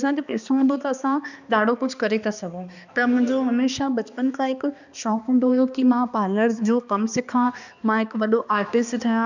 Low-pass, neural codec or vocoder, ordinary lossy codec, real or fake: 7.2 kHz; codec, 16 kHz, 2 kbps, X-Codec, HuBERT features, trained on balanced general audio; none; fake